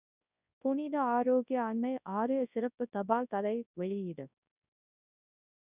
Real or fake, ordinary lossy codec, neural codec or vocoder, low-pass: fake; none; codec, 24 kHz, 0.9 kbps, WavTokenizer, large speech release; 3.6 kHz